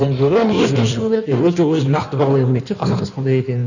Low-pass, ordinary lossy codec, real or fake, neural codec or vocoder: 7.2 kHz; none; fake; codec, 16 kHz in and 24 kHz out, 1.1 kbps, FireRedTTS-2 codec